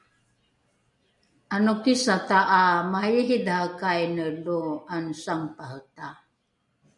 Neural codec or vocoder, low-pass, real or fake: none; 10.8 kHz; real